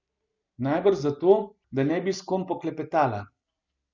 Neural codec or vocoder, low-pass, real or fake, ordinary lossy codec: none; 7.2 kHz; real; none